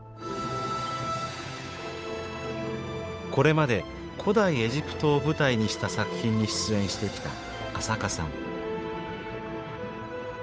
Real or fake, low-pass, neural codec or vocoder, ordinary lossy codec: fake; none; codec, 16 kHz, 8 kbps, FunCodec, trained on Chinese and English, 25 frames a second; none